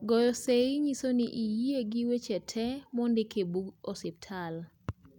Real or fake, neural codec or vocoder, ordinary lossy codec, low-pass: real; none; none; 19.8 kHz